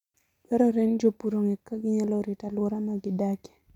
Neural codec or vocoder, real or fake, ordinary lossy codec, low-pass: none; real; none; 19.8 kHz